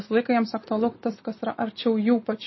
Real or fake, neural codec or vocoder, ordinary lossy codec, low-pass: real; none; MP3, 24 kbps; 7.2 kHz